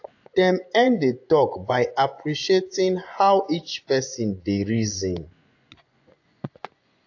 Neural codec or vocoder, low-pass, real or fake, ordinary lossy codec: vocoder, 24 kHz, 100 mel bands, Vocos; 7.2 kHz; fake; AAC, 48 kbps